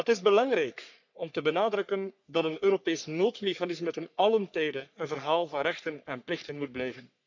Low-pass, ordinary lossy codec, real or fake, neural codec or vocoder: 7.2 kHz; none; fake; codec, 44.1 kHz, 3.4 kbps, Pupu-Codec